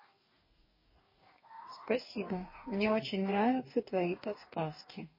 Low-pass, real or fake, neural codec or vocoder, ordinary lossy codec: 5.4 kHz; fake; codec, 44.1 kHz, 2.6 kbps, DAC; MP3, 24 kbps